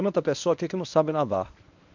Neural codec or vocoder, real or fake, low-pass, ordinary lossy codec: codec, 24 kHz, 0.9 kbps, WavTokenizer, medium speech release version 1; fake; 7.2 kHz; none